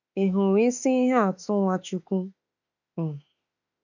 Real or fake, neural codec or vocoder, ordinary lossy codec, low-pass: fake; autoencoder, 48 kHz, 32 numbers a frame, DAC-VAE, trained on Japanese speech; none; 7.2 kHz